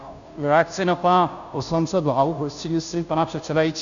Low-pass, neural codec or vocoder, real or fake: 7.2 kHz; codec, 16 kHz, 0.5 kbps, FunCodec, trained on Chinese and English, 25 frames a second; fake